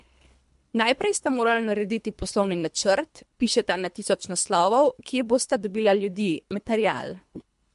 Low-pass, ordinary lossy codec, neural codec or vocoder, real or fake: 10.8 kHz; MP3, 64 kbps; codec, 24 kHz, 3 kbps, HILCodec; fake